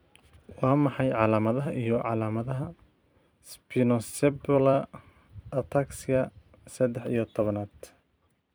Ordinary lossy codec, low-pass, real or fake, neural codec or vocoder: none; none; fake; vocoder, 44.1 kHz, 128 mel bands every 256 samples, BigVGAN v2